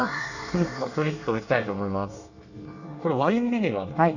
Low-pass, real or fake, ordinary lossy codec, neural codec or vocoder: 7.2 kHz; fake; none; codec, 24 kHz, 1 kbps, SNAC